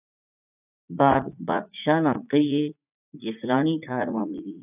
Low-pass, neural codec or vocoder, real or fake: 3.6 kHz; vocoder, 44.1 kHz, 80 mel bands, Vocos; fake